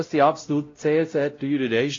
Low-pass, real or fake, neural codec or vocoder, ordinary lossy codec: 7.2 kHz; fake; codec, 16 kHz, 0.5 kbps, X-Codec, WavLM features, trained on Multilingual LibriSpeech; AAC, 32 kbps